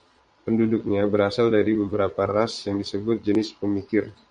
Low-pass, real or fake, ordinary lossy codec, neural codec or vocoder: 9.9 kHz; fake; Opus, 64 kbps; vocoder, 22.05 kHz, 80 mel bands, Vocos